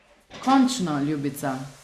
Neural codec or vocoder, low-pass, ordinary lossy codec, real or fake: none; 14.4 kHz; Opus, 64 kbps; real